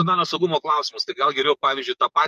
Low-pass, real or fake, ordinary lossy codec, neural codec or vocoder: 14.4 kHz; fake; MP3, 64 kbps; codec, 44.1 kHz, 7.8 kbps, DAC